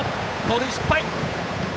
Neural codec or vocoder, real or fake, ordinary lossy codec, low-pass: codec, 16 kHz, 8 kbps, FunCodec, trained on Chinese and English, 25 frames a second; fake; none; none